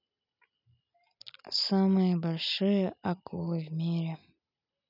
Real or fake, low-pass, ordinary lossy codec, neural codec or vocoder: real; 5.4 kHz; none; none